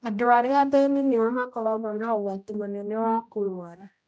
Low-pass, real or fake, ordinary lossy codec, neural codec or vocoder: none; fake; none; codec, 16 kHz, 0.5 kbps, X-Codec, HuBERT features, trained on general audio